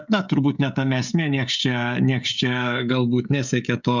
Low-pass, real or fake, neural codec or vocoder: 7.2 kHz; fake; codec, 16 kHz, 16 kbps, FreqCodec, smaller model